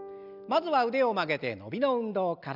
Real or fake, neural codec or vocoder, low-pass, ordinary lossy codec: real; none; 5.4 kHz; none